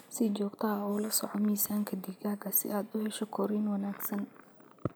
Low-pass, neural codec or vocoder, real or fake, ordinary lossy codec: none; none; real; none